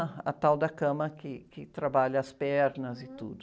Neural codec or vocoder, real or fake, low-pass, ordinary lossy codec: none; real; none; none